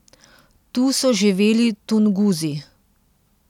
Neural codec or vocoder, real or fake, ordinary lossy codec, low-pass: none; real; none; 19.8 kHz